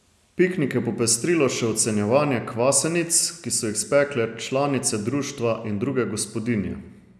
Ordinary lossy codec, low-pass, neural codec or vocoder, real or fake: none; none; none; real